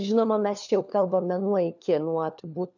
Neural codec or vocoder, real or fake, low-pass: codec, 16 kHz, 4 kbps, FunCodec, trained on LibriTTS, 50 frames a second; fake; 7.2 kHz